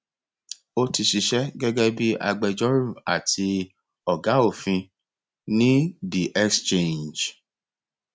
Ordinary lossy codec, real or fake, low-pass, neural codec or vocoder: none; real; none; none